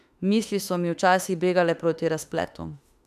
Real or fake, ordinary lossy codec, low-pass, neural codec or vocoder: fake; none; 14.4 kHz; autoencoder, 48 kHz, 32 numbers a frame, DAC-VAE, trained on Japanese speech